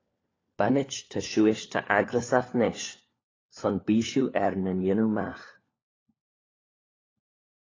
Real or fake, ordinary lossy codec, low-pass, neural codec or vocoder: fake; AAC, 32 kbps; 7.2 kHz; codec, 16 kHz, 4 kbps, FunCodec, trained on LibriTTS, 50 frames a second